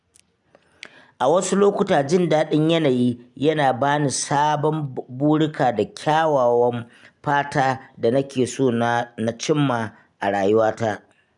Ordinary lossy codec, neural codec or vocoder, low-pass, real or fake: none; none; 10.8 kHz; real